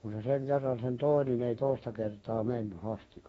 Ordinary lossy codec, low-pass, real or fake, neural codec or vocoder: AAC, 24 kbps; 19.8 kHz; fake; autoencoder, 48 kHz, 32 numbers a frame, DAC-VAE, trained on Japanese speech